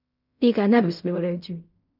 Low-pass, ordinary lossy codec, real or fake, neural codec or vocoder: 5.4 kHz; none; fake; codec, 16 kHz in and 24 kHz out, 0.4 kbps, LongCat-Audio-Codec, fine tuned four codebook decoder